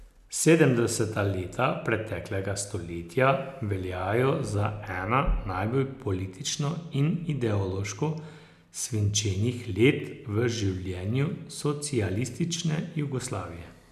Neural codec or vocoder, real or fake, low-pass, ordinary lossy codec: none; real; 14.4 kHz; none